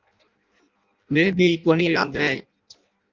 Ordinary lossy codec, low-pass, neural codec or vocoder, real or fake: Opus, 32 kbps; 7.2 kHz; codec, 16 kHz in and 24 kHz out, 0.6 kbps, FireRedTTS-2 codec; fake